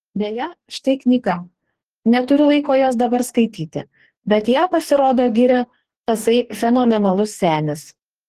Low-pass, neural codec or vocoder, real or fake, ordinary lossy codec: 14.4 kHz; codec, 44.1 kHz, 2.6 kbps, DAC; fake; Opus, 16 kbps